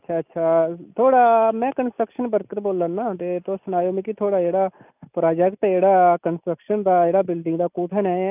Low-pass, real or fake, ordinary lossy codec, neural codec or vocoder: 3.6 kHz; real; none; none